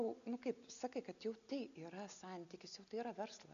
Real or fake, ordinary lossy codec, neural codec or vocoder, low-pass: real; AAC, 96 kbps; none; 7.2 kHz